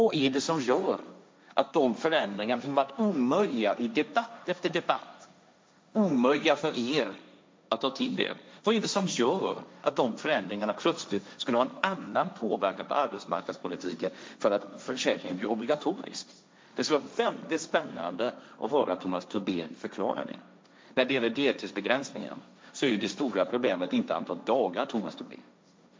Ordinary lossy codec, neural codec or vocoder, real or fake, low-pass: none; codec, 16 kHz, 1.1 kbps, Voila-Tokenizer; fake; none